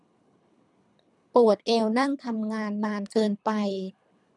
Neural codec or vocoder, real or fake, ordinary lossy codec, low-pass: codec, 24 kHz, 3 kbps, HILCodec; fake; none; 10.8 kHz